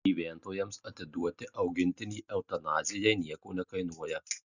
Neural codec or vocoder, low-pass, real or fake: none; 7.2 kHz; real